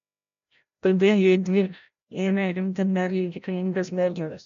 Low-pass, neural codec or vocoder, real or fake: 7.2 kHz; codec, 16 kHz, 0.5 kbps, FreqCodec, larger model; fake